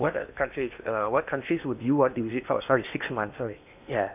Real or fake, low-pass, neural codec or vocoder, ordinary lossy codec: fake; 3.6 kHz; codec, 16 kHz in and 24 kHz out, 0.8 kbps, FocalCodec, streaming, 65536 codes; none